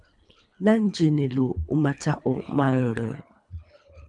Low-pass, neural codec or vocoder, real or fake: 10.8 kHz; codec, 24 kHz, 3 kbps, HILCodec; fake